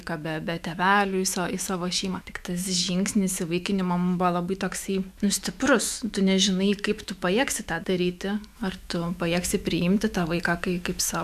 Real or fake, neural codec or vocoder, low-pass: fake; autoencoder, 48 kHz, 128 numbers a frame, DAC-VAE, trained on Japanese speech; 14.4 kHz